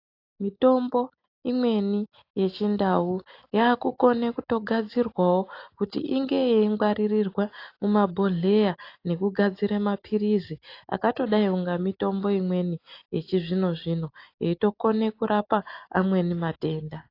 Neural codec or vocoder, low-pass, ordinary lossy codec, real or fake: none; 5.4 kHz; AAC, 32 kbps; real